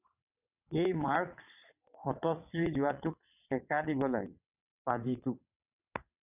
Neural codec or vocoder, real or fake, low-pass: vocoder, 22.05 kHz, 80 mel bands, WaveNeXt; fake; 3.6 kHz